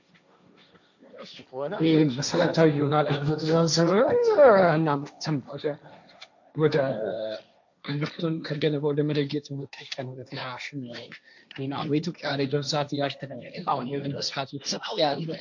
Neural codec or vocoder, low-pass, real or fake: codec, 16 kHz, 1.1 kbps, Voila-Tokenizer; 7.2 kHz; fake